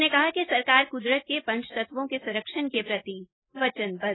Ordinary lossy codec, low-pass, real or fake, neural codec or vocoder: AAC, 16 kbps; 7.2 kHz; real; none